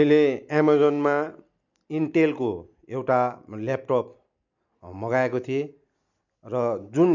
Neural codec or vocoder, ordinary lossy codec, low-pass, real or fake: none; none; 7.2 kHz; real